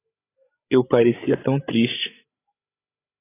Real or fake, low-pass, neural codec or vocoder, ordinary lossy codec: fake; 3.6 kHz; codec, 16 kHz, 8 kbps, FreqCodec, larger model; AAC, 16 kbps